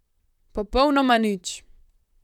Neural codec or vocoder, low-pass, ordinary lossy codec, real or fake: vocoder, 44.1 kHz, 128 mel bands, Pupu-Vocoder; 19.8 kHz; none; fake